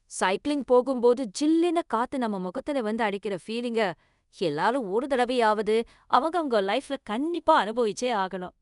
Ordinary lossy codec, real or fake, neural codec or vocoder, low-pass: none; fake; codec, 24 kHz, 0.5 kbps, DualCodec; 10.8 kHz